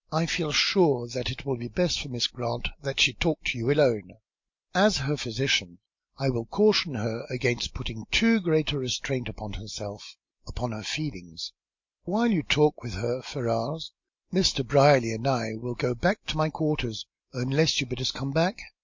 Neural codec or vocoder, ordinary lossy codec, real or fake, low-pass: none; MP3, 48 kbps; real; 7.2 kHz